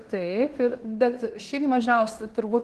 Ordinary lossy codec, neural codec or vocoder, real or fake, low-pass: Opus, 16 kbps; codec, 16 kHz in and 24 kHz out, 0.9 kbps, LongCat-Audio-Codec, fine tuned four codebook decoder; fake; 10.8 kHz